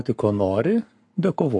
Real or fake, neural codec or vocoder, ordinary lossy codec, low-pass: fake; codec, 44.1 kHz, 7.8 kbps, Pupu-Codec; MP3, 48 kbps; 10.8 kHz